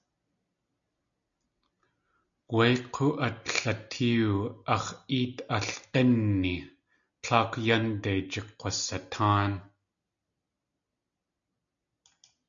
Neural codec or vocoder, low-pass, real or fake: none; 7.2 kHz; real